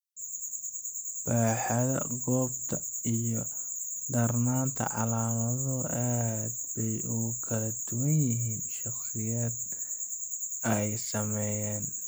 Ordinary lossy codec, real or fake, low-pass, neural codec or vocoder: none; real; none; none